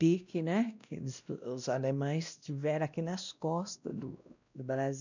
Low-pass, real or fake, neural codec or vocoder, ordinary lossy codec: 7.2 kHz; fake; codec, 16 kHz, 1 kbps, X-Codec, WavLM features, trained on Multilingual LibriSpeech; none